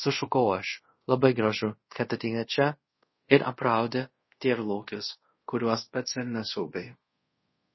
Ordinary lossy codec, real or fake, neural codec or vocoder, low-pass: MP3, 24 kbps; fake; codec, 24 kHz, 0.5 kbps, DualCodec; 7.2 kHz